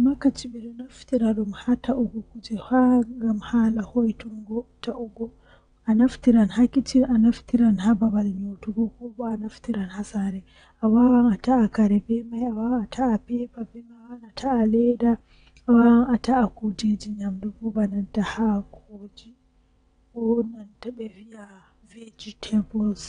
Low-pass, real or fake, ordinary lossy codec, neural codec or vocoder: 9.9 kHz; fake; none; vocoder, 22.05 kHz, 80 mel bands, WaveNeXt